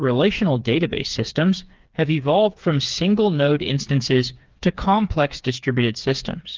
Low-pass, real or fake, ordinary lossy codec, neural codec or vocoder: 7.2 kHz; fake; Opus, 32 kbps; codec, 16 kHz, 4 kbps, FreqCodec, smaller model